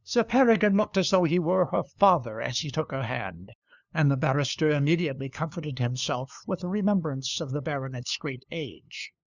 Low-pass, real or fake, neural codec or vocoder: 7.2 kHz; fake; codec, 16 kHz, 2 kbps, FunCodec, trained on LibriTTS, 25 frames a second